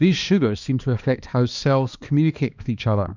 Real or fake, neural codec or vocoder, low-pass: fake; codec, 16 kHz, 2 kbps, X-Codec, HuBERT features, trained on balanced general audio; 7.2 kHz